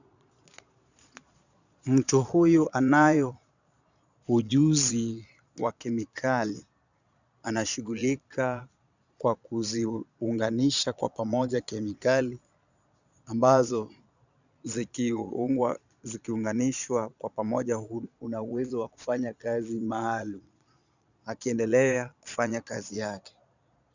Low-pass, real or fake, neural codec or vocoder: 7.2 kHz; fake; vocoder, 22.05 kHz, 80 mel bands, Vocos